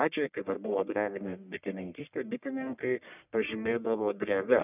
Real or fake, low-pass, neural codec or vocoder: fake; 3.6 kHz; codec, 44.1 kHz, 1.7 kbps, Pupu-Codec